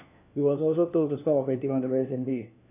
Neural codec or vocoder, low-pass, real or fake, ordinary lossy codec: codec, 16 kHz, 1 kbps, FunCodec, trained on LibriTTS, 50 frames a second; 3.6 kHz; fake; AAC, 32 kbps